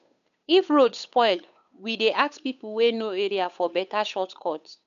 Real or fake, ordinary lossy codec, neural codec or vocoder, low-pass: fake; none; codec, 16 kHz, 8 kbps, FunCodec, trained on Chinese and English, 25 frames a second; 7.2 kHz